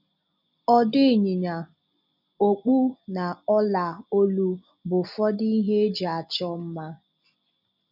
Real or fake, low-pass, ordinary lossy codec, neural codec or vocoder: real; 5.4 kHz; none; none